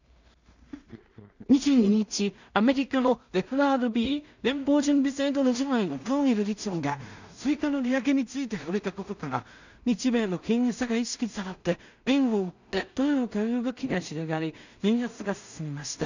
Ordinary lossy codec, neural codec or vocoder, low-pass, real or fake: none; codec, 16 kHz in and 24 kHz out, 0.4 kbps, LongCat-Audio-Codec, two codebook decoder; 7.2 kHz; fake